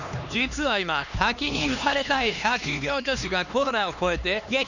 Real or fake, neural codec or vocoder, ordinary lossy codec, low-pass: fake; codec, 16 kHz, 2 kbps, X-Codec, HuBERT features, trained on LibriSpeech; none; 7.2 kHz